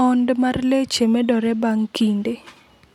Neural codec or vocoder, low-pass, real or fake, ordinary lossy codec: none; 19.8 kHz; real; none